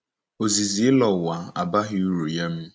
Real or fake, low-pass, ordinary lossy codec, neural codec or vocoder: real; none; none; none